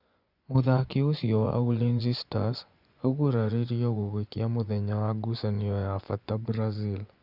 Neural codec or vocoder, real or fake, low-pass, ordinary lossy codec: vocoder, 24 kHz, 100 mel bands, Vocos; fake; 5.4 kHz; Opus, 64 kbps